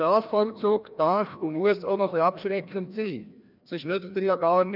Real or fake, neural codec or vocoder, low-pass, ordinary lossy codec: fake; codec, 16 kHz, 1 kbps, FreqCodec, larger model; 5.4 kHz; none